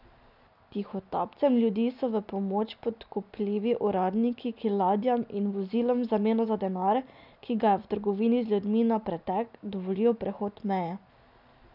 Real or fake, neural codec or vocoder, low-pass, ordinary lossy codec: real; none; 5.4 kHz; none